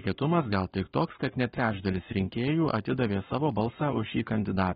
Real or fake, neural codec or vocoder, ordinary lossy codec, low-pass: fake; codec, 44.1 kHz, 7.8 kbps, Pupu-Codec; AAC, 16 kbps; 19.8 kHz